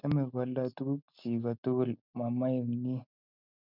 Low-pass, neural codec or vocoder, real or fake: 5.4 kHz; none; real